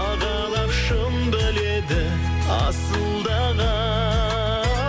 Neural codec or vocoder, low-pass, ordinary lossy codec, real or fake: none; none; none; real